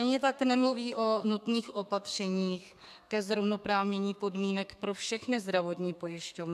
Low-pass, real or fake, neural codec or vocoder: 14.4 kHz; fake; codec, 32 kHz, 1.9 kbps, SNAC